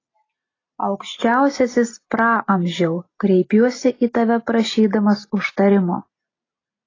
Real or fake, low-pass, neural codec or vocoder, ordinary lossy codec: real; 7.2 kHz; none; AAC, 32 kbps